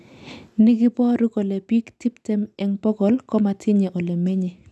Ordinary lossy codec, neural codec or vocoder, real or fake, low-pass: none; none; real; none